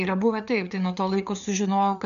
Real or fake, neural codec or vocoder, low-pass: fake; codec, 16 kHz, 4 kbps, FreqCodec, larger model; 7.2 kHz